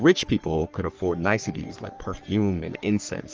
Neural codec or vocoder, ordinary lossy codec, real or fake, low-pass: codec, 44.1 kHz, 3.4 kbps, Pupu-Codec; Opus, 24 kbps; fake; 7.2 kHz